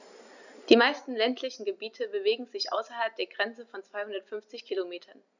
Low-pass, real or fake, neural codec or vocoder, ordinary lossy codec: 7.2 kHz; real; none; none